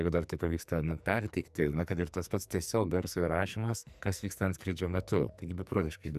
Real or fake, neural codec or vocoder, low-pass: fake; codec, 44.1 kHz, 2.6 kbps, SNAC; 14.4 kHz